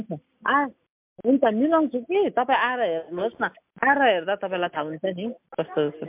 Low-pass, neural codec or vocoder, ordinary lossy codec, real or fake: 3.6 kHz; none; MP3, 32 kbps; real